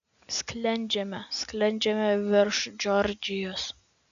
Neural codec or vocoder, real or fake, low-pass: none; real; 7.2 kHz